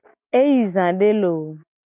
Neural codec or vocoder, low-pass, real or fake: none; 3.6 kHz; real